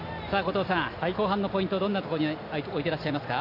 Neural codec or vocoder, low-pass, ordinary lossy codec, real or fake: none; 5.4 kHz; AAC, 32 kbps; real